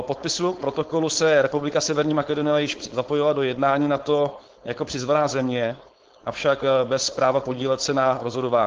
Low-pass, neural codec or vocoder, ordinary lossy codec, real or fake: 7.2 kHz; codec, 16 kHz, 4.8 kbps, FACodec; Opus, 16 kbps; fake